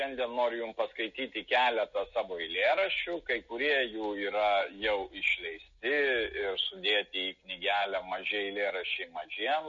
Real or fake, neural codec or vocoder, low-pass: real; none; 7.2 kHz